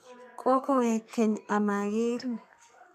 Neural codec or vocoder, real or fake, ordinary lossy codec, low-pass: codec, 32 kHz, 1.9 kbps, SNAC; fake; none; 14.4 kHz